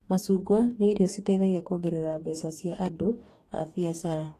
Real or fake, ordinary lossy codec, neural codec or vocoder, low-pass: fake; AAC, 48 kbps; codec, 44.1 kHz, 2.6 kbps, DAC; 14.4 kHz